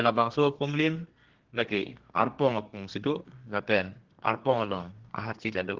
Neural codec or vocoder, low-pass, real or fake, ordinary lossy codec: codec, 32 kHz, 1.9 kbps, SNAC; 7.2 kHz; fake; Opus, 16 kbps